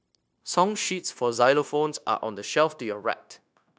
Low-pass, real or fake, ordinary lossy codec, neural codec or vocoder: none; fake; none; codec, 16 kHz, 0.9 kbps, LongCat-Audio-Codec